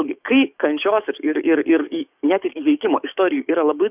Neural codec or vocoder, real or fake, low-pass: codec, 44.1 kHz, 7.8 kbps, DAC; fake; 3.6 kHz